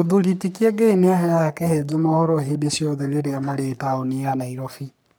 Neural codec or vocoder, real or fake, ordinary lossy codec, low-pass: codec, 44.1 kHz, 3.4 kbps, Pupu-Codec; fake; none; none